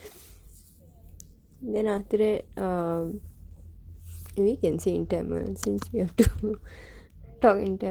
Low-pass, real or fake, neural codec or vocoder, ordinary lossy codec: 19.8 kHz; real; none; Opus, 16 kbps